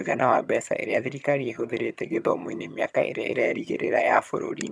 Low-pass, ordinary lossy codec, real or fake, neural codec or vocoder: none; none; fake; vocoder, 22.05 kHz, 80 mel bands, HiFi-GAN